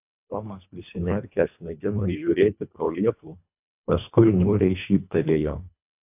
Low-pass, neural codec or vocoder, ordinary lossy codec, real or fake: 3.6 kHz; codec, 24 kHz, 1.5 kbps, HILCodec; AAC, 32 kbps; fake